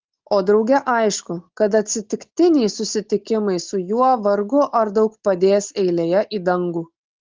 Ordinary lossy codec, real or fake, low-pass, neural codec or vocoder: Opus, 16 kbps; real; 7.2 kHz; none